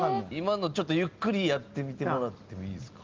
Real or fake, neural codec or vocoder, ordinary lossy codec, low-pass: real; none; Opus, 32 kbps; 7.2 kHz